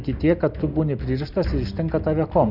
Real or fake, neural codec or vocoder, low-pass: real; none; 5.4 kHz